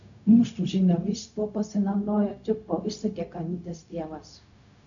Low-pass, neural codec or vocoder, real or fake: 7.2 kHz; codec, 16 kHz, 0.4 kbps, LongCat-Audio-Codec; fake